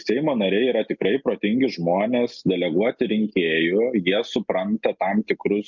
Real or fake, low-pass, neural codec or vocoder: real; 7.2 kHz; none